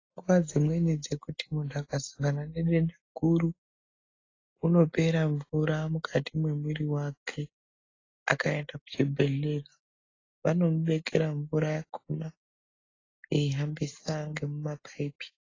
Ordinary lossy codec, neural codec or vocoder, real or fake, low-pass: AAC, 32 kbps; none; real; 7.2 kHz